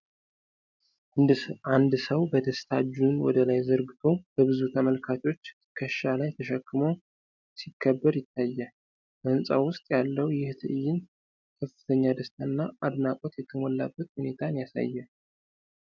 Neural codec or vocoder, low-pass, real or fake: none; 7.2 kHz; real